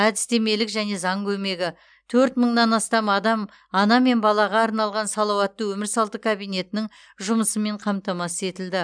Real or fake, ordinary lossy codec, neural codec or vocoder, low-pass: real; none; none; 9.9 kHz